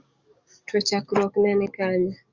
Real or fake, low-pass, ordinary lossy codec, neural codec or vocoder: fake; 7.2 kHz; Opus, 64 kbps; codec, 44.1 kHz, 7.8 kbps, DAC